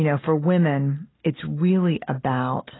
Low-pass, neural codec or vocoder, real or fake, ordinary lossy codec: 7.2 kHz; none; real; AAC, 16 kbps